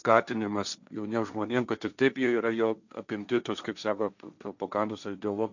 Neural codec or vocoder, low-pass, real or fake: codec, 16 kHz, 1.1 kbps, Voila-Tokenizer; 7.2 kHz; fake